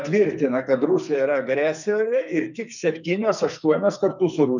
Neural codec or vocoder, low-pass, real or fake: codec, 44.1 kHz, 2.6 kbps, SNAC; 7.2 kHz; fake